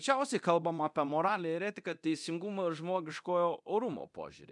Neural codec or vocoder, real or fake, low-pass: codec, 24 kHz, 0.9 kbps, DualCodec; fake; 10.8 kHz